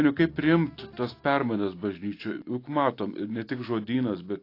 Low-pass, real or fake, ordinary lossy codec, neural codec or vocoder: 5.4 kHz; real; AAC, 32 kbps; none